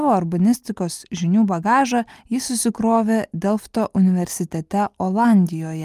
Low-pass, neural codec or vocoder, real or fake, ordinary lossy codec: 14.4 kHz; none; real; Opus, 32 kbps